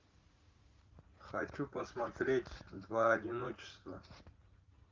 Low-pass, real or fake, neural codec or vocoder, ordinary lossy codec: 7.2 kHz; fake; vocoder, 44.1 kHz, 80 mel bands, Vocos; Opus, 24 kbps